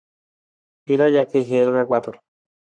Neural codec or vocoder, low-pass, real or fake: codec, 44.1 kHz, 3.4 kbps, Pupu-Codec; 9.9 kHz; fake